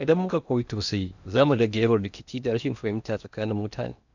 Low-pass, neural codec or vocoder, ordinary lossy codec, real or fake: 7.2 kHz; codec, 16 kHz in and 24 kHz out, 0.8 kbps, FocalCodec, streaming, 65536 codes; none; fake